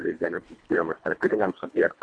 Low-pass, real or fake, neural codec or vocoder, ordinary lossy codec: 9.9 kHz; fake; codec, 24 kHz, 1.5 kbps, HILCodec; AAC, 48 kbps